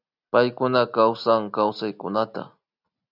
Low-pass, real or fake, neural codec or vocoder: 5.4 kHz; real; none